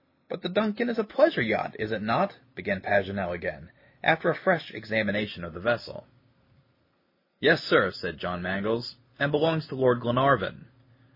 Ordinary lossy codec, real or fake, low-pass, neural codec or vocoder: MP3, 24 kbps; real; 5.4 kHz; none